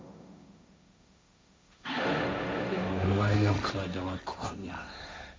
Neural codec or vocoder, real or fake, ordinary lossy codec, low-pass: codec, 16 kHz, 1.1 kbps, Voila-Tokenizer; fake; none; none